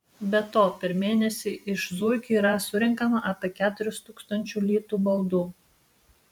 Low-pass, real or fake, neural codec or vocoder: 19.8 kHz; fake; vocoder, 44.1 kHz, 128 mel bands every 512 samples, BigVGAN v2